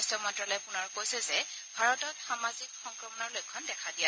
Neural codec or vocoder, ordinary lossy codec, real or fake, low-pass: none; none; real; none